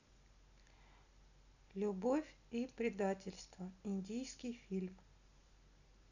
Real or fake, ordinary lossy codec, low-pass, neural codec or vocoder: real; none; 7.2 kHz; none